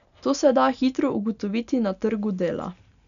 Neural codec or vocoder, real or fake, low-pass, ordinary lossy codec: none; real; 7.2 kHz; none